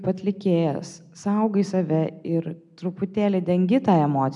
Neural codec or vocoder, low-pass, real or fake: none; 10.8 kHz; real